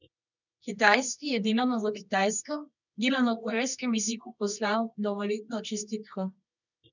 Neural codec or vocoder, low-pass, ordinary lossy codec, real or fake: codec, 24 kHz, 0.9 kbps, WavTokenizer, medium music audio release; 7.2 kHz; none; fake